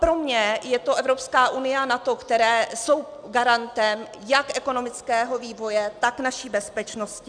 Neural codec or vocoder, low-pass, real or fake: none; 9.9 kHz; real